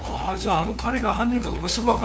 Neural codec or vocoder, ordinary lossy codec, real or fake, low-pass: codec, 16 kHz, 2 kbps, FunCodec, trained on LibriTTS, 25 frames a second; none; fake; none